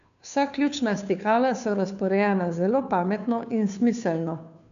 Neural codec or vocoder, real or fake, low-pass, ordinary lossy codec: codec, 16 kHz, 2 kbps, FunCodec, trained on Chinese and English, 25 frames a second; fake; 7.2 kHz; none